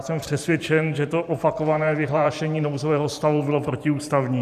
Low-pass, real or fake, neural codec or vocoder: 14.4 kHz; fake; vocoder, 44.1 kHz, 128 mel bands every 512 samples, BigVGAN v2